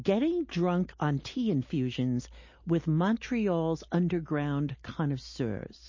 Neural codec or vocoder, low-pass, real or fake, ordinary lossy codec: none; 7.2 kHz; real; MP3, 32 kbps